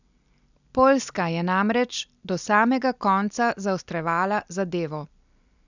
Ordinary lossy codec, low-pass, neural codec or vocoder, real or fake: none; 7.2 kHz; none; real